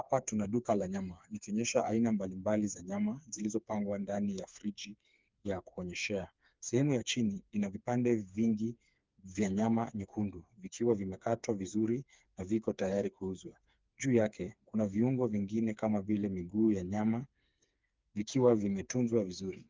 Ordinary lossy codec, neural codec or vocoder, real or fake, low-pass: Opus, 24 kbps; codec, 16 kHz, 4 kbps, FreqCodec, smaller model; fake; 7.2 kHz